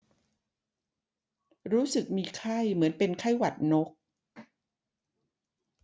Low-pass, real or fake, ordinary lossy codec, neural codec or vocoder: none; real; none; none